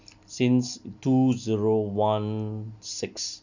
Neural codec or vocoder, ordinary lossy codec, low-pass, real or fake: none; none; 7.2 kHz; real